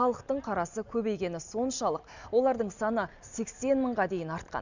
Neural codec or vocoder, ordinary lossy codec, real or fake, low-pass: none; none; real; 7.2 kHz